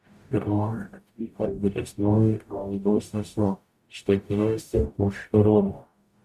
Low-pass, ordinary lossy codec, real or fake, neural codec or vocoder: 14.4 kHz; Opus, 64 kbps; fake; codec, 44.1 kHz, 0.9 kbps, DAC